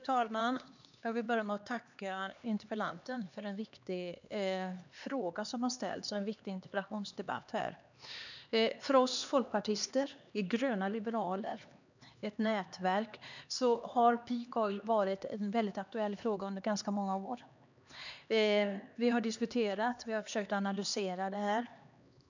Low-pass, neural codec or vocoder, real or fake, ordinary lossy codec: 7.2 kHz; codec, 16 kHz, 2 kbps, X-Codec, HuBERT features, trained on LibriSpeech; fake; none